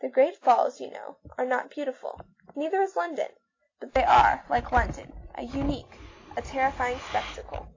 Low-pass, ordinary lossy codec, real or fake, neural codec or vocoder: 7.2 kHz; AAC, 32 kbps; real; none